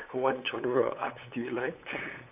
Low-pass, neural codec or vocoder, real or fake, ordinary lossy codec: 3.6 kHz; codec, 16 kHz, 8 kbps, FunCodec, trained on LibriTTS, 25 frames a second; fake; none